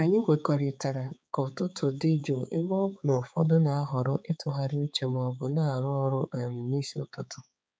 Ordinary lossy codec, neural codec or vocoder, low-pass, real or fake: none; codec, 16 kHz, 4 kbps, X-Codec, HuBERT features, trained on balanced general audio; none; fake